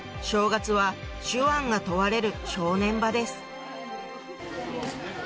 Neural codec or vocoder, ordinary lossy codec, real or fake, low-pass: none; none; real; none